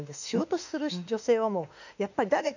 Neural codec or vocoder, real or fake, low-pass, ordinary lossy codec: autoencoder, 48 kHz, 32 numbers a frame, DAC-VAE, trained on Japanese speech; fake; 7.2 kHz; none